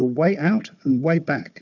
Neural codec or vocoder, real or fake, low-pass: codec, 16 kHz, 4 kbps, FunCodec, trained on Chinese and English, 50 frames a second; fake; 7.2 kHz